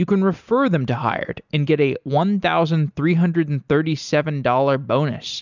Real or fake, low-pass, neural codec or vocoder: real; 7.2 kHz; none